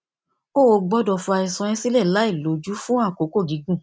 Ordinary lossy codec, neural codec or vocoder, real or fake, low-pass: none; none; real; none